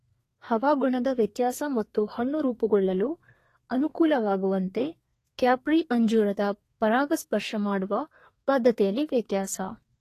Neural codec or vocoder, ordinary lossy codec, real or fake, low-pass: codec, 32 kHz, 1.9 kbps, SNAC; AAC, 48 kbps; fake; 14.4 kHz